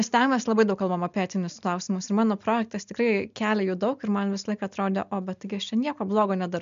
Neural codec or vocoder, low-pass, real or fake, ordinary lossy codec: none; 7.2 kHz; real; MP3, 64 kbps